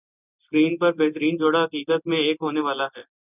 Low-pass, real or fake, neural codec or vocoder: 3.6 kHz; real; none